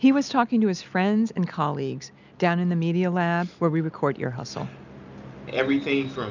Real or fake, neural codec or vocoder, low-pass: real; none; 7.2 kHz